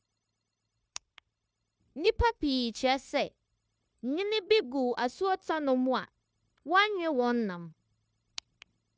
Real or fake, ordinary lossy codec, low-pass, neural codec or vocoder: fake; none; none; codec, 16 kHz, 0.9 kbps, LongCat-Audio-Codec